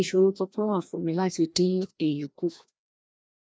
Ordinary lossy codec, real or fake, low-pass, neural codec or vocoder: none; fake; none; codec, 16 kHz, 1 kbps, FreqCodec, larger model